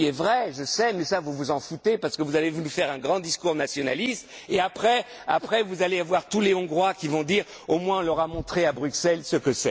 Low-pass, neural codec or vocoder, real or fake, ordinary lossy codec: none; none; real; none